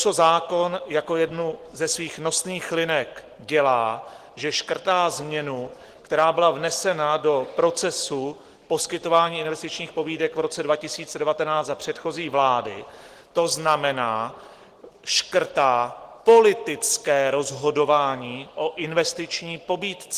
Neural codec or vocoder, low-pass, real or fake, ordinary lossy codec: none; 14.4 kHz; real; Opus, 16 kbps